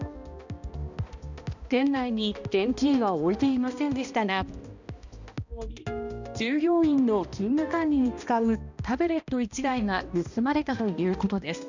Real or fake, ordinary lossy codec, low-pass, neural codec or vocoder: fake; none; 7.2 kHz; codec, 16 kHz, 1 kbps, X-Codec, HuBERT features, trained on balanced general audio